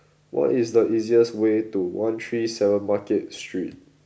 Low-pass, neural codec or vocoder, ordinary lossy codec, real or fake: none; none; none; real